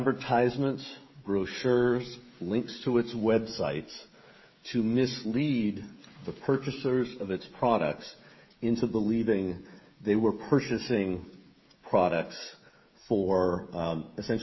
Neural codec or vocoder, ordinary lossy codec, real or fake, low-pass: codec, 16 kHz, 16 kbps, FreqCodec, smaller model; MP3, 24 kbps; fake; 7.2 kHz